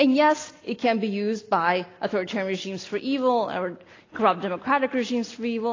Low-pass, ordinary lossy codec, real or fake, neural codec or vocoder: 7.2 kHz; AAC, 32 kbps; real; none